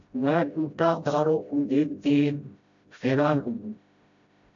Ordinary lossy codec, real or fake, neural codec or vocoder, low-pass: AAC, 64 kbps; fake; codec, 16 kHz, 0.5 kbps, FreqCodec, smaller model; 7.2 kHz